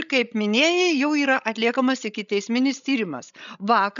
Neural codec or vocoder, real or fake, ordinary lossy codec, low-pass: codec, 16 kHz, 16 kbps, FreqCodec, larger model; fake; MP3, 96 kbps; 7.2 kHz